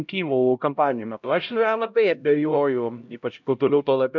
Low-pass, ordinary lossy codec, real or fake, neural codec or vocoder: 7.2 kHz; MP3, 64 kbps; fake; codec, 16 kHz, 0.5 kbps, X-Codec, HuBERT features, trained on LibriSpeech